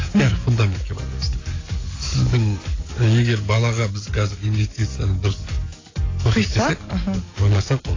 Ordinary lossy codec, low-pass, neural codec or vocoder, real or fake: AAC, 32 kbps; 7.2 kHz; codec, 44.1 kHz, 7.8 kbps, DAC; fake